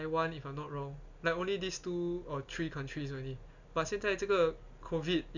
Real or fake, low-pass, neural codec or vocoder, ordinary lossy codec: real; 7.2 kHz; none; none